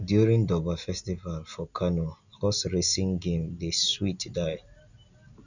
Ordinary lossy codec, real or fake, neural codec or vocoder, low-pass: none; real; none; 7.2 kHz